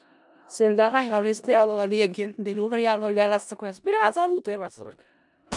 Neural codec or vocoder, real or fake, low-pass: codec, 16 kHz in and 24 kHz out, 0.4 kbps, LongCat-Audio-Codec, four codebook decoder; fake; 10.8 kHz